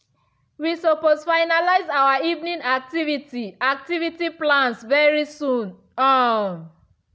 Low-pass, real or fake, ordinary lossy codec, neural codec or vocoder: none; real; none; none